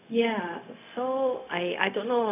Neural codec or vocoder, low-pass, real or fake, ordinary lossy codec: codec, 16 kHz, 0.4 kbps, LongCat-Audio-Codec; 3.6 kHz; fake; none